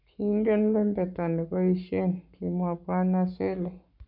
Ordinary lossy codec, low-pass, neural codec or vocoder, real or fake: none; 5.4 kHz; codec, 44.1 kHz, 7.8 kbps, Pupu-Codec; fake